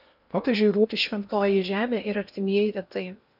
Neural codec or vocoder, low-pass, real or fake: codec, 16 kHz in and 24 kHz out, 0.8 kbps, FocalCodec, streaming, 65536 codes; 5.4 kHz; fake